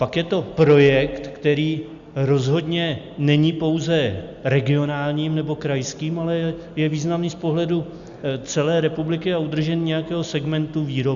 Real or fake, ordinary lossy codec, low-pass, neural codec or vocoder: real; Opus, 64 kbps; 7.2 kHz; none